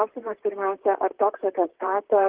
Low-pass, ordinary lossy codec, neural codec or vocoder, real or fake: 3.6 kHz; Opus, 16 kbps; vocoder, 44.1 kHz, 128 mel bands, Pupu-Vocoder; fake